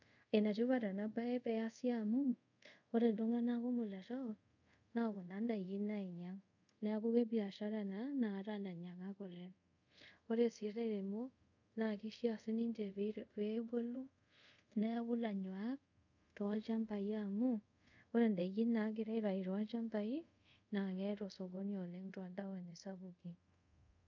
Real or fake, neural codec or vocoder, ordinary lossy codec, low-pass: fake; codec, 24 kHz, 0.5 kbps, DualCodec; none; 7.2 kHz